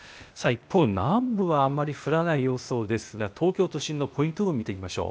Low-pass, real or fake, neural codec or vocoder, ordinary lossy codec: none; fake; codec, 16 kHz, 0.8 kbps, ZipCodec; none